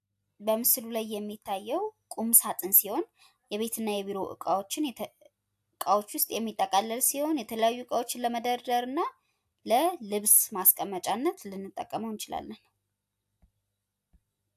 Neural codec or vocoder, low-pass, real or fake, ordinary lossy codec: none; 14.4 kHz; real; AAC, 96 kbps